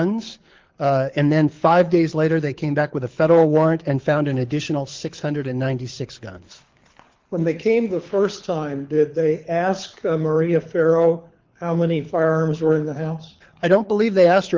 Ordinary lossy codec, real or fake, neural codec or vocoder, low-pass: Opus, 32 kbps; fake; codec, 24 kHz, 6 kbps, HILCodec; 7.2 kHz